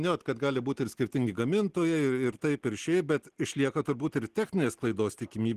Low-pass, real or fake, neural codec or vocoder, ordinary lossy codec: 14.4 kHz; real; none; Opus, 16 kbps